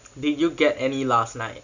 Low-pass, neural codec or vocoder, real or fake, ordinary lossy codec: 7.2 kHz; none; real; none